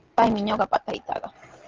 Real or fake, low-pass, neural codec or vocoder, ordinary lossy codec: real; 7.2 kHz; none; Opus, 16 kbps